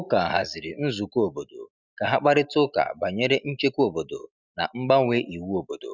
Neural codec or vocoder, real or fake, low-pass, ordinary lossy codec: none; real; 7.2 kHz; none